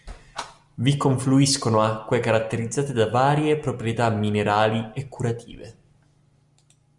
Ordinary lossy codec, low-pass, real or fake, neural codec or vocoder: Opus, 64 kbps; 10.8 kHz; real; none